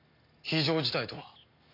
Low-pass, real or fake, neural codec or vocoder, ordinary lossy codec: 5.4 kHz; real; none; MP3, 32 kbps